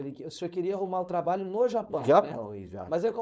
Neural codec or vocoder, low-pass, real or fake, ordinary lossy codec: codec, 16 kHz, 4.8 kbps, FACodec; none; fake; none